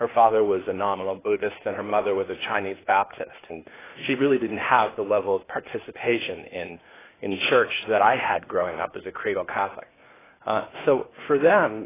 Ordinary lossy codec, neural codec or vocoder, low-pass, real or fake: AAC, 16 kbps; codec, 16 kHz, 0.8 kbps, ZipCodec; 3.6 kHz; fake